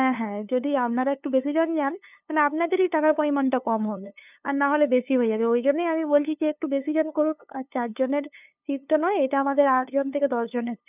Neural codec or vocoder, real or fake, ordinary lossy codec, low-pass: codec, 16 kHz, 2 kbps, FunCodec, trained on LibriTTS, 25 frames a second; fake; none; 3.6 kHz